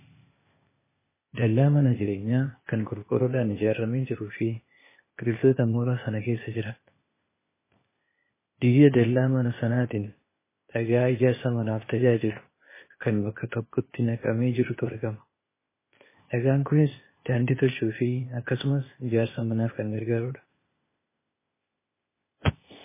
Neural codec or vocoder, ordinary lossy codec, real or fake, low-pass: codec, 16 kHz, 0.8 kbps, ZipCodec; MP3, 16 kbps; fake; 3.6 kHz